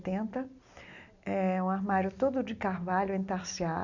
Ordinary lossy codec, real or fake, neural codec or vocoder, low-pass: none; real; none; 7.2 kHz